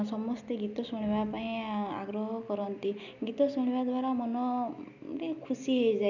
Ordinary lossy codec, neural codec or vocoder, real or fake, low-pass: none; none; real; 7.2 kHz